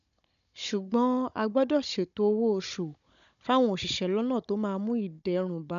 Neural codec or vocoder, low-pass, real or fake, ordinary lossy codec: codec, 16 kHz, 16 kbps, FunCodec, trained on Chinese and English, 50 frames a second; 7.2 kHz; fake; MP3, 96 kbps